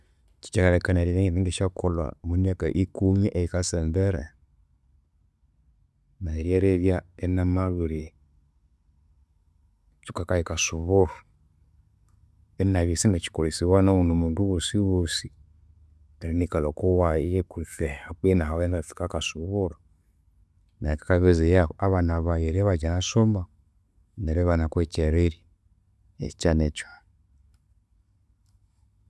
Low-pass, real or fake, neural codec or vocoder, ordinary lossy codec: none; real; none; none